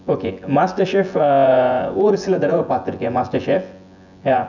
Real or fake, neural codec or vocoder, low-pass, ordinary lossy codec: fake; vocoder, 24 kHz, 100 mel bands, Vocos; 7.2 kHz; none